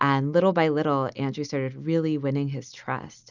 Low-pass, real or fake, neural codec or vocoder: 7.2 kHz; real; none